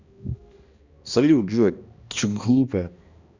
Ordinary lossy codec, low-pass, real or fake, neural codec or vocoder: Opus, 64 kbps; 7.2 kHz; fake; codec, 16 kHz, 1 kbps, X-Codec, HuBERT features, trained on balanced general audio